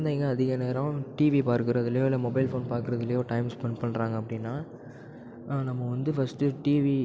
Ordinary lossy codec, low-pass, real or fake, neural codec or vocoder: none; none; real; none